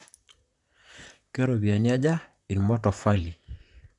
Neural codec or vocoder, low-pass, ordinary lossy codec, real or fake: vocoder, 44.1 kHz, 128 mel bands, Pupu-Vocoder; 10.8 kHz; none; fake